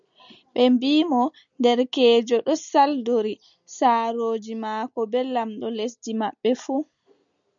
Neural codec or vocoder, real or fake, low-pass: none; real; 7.2 kHz